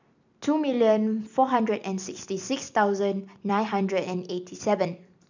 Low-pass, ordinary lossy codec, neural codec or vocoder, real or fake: 7.2 kHz; none; none; real